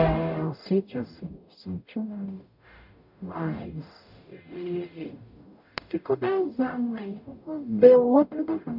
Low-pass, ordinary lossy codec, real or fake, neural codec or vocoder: 5.4 kHz; none; fake; codec, 44.1 kHz, 0.9 kbps, DAC